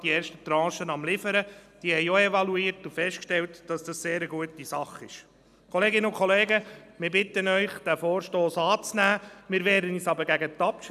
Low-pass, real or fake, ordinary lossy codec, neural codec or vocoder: 14.4 kHz; real; none; none